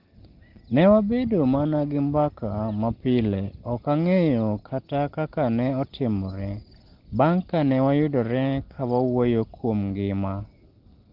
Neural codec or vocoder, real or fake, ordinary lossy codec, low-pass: none; real; Opus, 16 kbps; 5.4 kHz